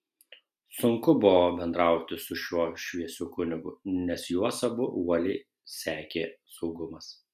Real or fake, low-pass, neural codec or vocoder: real; 14.4 kHz; none